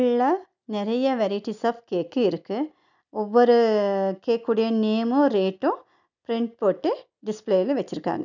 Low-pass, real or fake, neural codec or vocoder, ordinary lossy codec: 7.2 kHz; real; none; none